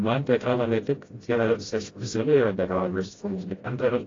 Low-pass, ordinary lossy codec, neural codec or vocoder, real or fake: 7.2 kHz; AAC, 32 kbps; codec, 16 kHz, 0.5 kbps, FreqCodec, smaller model; fake